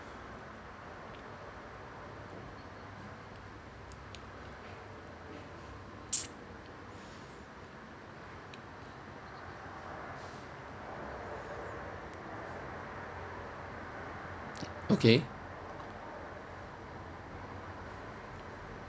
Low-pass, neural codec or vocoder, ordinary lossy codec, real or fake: none; none; none; real